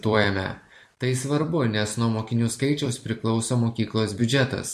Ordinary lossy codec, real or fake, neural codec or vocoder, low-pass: MP3, 64 kbps; fake; vocoder, 44.1 kHz, 128 mel bands every 256 samples, BigVGAN v2; 14.4 kHz